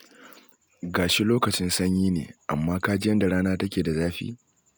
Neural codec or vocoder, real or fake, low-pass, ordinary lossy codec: none; real; none; none